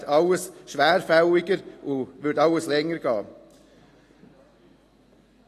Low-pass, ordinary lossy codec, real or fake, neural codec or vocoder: 14.4 kHz; AAC, 48 kbps; real; none